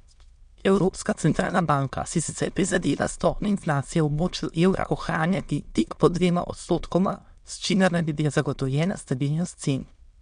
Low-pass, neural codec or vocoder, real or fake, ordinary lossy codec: 9.9 kHz; autoencoder, 22.05 kHz, a latent of 192 numbers a frame, VITS, trained on many speakers; fake; MP3, 64 kbps